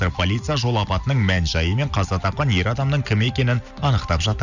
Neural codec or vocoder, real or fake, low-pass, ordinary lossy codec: none; real; 7.2 kHz; none